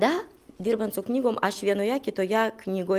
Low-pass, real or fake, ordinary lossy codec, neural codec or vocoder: 14.4 kHz; real; Opus, 32 kbps; none